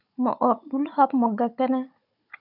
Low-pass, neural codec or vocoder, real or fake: 5.4 kHz; codec, 16 kHz, 16 kbps, FunCodec, trained on Chinese and English, 50 frames a second; fake